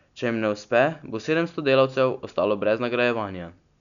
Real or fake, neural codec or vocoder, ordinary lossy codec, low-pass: real; none; none; 7.2 kHz